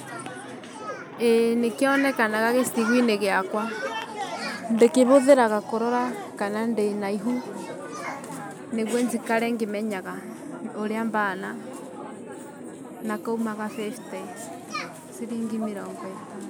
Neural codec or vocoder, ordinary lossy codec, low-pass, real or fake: none; none; none; real